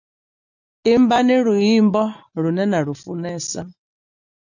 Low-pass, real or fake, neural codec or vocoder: 7.2 kHz; real; none